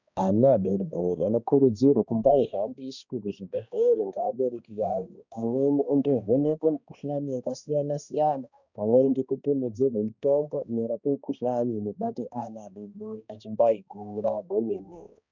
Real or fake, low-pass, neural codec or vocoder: fake; 7.2 kHz; codec, 16 kHz, 1 kbps, X-Codec, HuBERT features, trained on balanced general audio